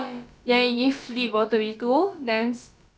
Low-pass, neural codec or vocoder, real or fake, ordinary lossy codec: none; codec, 16 kHz, about 1 kbps, DyCAST, with the encoder's durations; fake; none